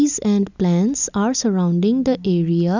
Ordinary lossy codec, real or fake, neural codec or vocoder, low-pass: none; real; none; 7.2 kHz